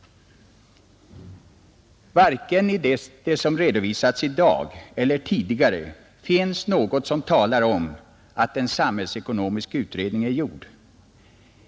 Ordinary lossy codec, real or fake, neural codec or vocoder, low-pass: none; real; none; none